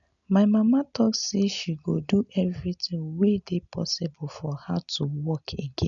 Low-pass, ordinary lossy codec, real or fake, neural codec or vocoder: 7.2 kHz; none; real; none